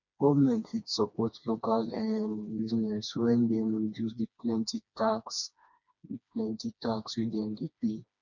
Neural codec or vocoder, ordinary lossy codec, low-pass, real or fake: codec, 16 kHz, 2 kbps, FreqCodec, smaller model; none; 7.2 kHz; fake